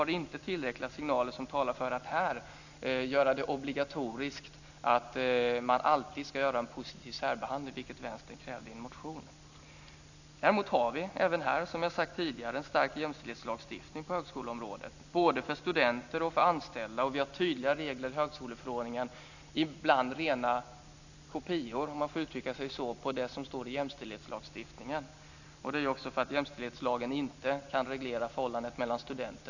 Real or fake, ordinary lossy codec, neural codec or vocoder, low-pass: real; none; none; 7.2 kHz